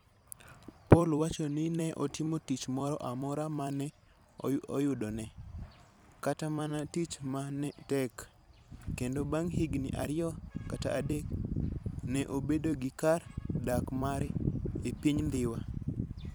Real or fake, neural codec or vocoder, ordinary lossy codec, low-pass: fake; vocoder, 44.1 kHz, 128 mel bands every 256 samples, BigVGAN v2; none; none